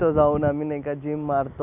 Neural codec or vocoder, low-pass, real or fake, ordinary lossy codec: none; 3.6 kHz; real; none